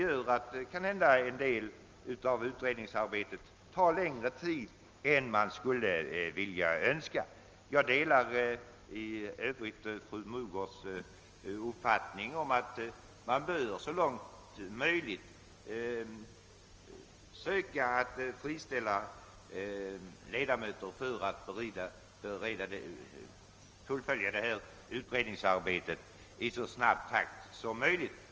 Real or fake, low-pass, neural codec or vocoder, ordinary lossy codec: real; 7.2 kHz; none; Opus, 24 kbps